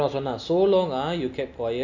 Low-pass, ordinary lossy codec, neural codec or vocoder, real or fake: 7.2 kHz; none; none; real